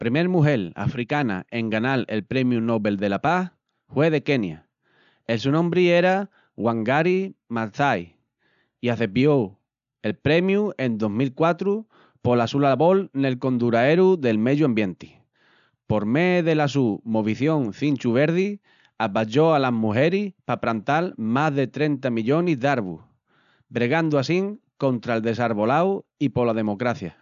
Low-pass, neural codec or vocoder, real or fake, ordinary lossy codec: 7.2 kHz; none; real; none